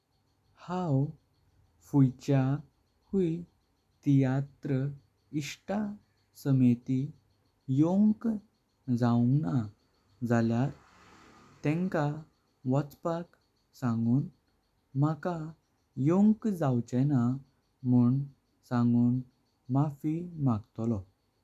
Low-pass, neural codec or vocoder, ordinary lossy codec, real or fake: 14.4 kHz; none; none; real